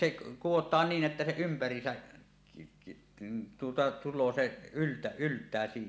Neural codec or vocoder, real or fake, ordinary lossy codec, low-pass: none; real; none; none